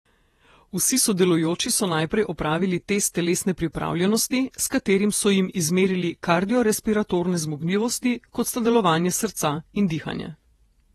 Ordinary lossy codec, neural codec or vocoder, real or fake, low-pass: AAC, 32 kbps; vocoder, 48 kHz, 128 mel bands, Vocos; fake; 19.8 kHz